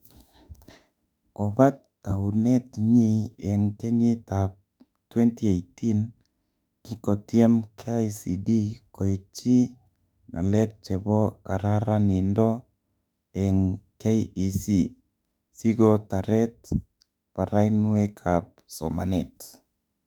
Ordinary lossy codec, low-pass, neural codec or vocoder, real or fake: none; 19.8 kHz; autoencoder, 48 kHz, 32 numbers a frame, DAC-VAE, trained on Japanese speech; fake